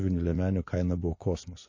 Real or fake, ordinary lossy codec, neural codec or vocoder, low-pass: fake; MP3, 32 kbps; vocoder, 24 kHz, 100 mel bands, Vocos; 7.2 kHz